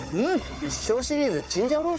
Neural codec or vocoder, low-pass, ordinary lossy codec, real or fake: codec, 16 kHz, 4 kbps, FunCodec, trained on Chinese and English, 50 frames a second; none; none; fake